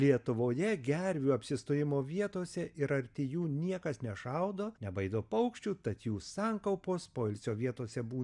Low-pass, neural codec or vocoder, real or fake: 10.8 kHz; none; real